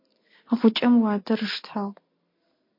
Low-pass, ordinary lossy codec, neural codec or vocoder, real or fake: 5.4 kHz; MP3, 32 kbps; none; real